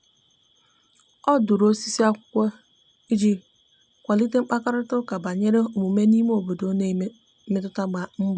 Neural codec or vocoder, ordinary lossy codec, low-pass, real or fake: none; none; none; real